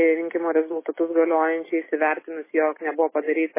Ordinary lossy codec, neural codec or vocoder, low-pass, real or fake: MP3, 16 kbps; none; 3.6 kHz; real